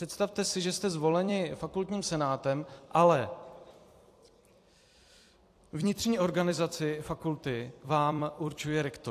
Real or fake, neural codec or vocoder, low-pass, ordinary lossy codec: fake; vocoder, 44.1 kHz, 128 mel bands every 256 samples, BigVGAN v2; 14.4 kHz; AAC, 64 kbps